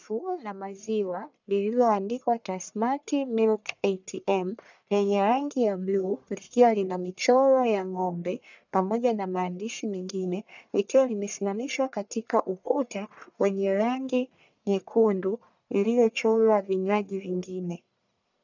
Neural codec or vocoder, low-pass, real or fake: codec, 44.1 kHz, 1.7 kbps, Pupu-Codec; 7.2 kHz; fake